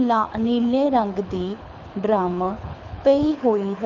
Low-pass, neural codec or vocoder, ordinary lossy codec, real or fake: 7.2 kHz; codec, 24 kHz, 6 kbps, HILCodec; none; fake